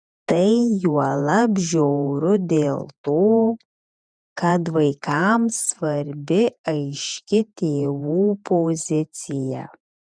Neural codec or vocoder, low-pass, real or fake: vocoder, 48 kHz, 128 mel bands, Vocos; 9.9 kHz; fake